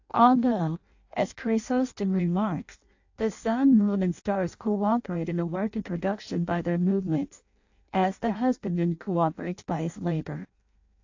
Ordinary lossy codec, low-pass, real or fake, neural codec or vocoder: AAC, 48 kbps; 7.2 kHz; fake; codec, 16 kHz in and 24 kHz out, 0.6 kbps, FireRedTTS-2 codec